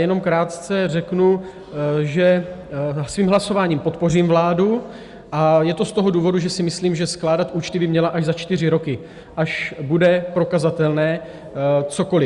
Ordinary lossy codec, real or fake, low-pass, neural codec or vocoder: Opus, 64 kbps; real; 10.8 kHz; none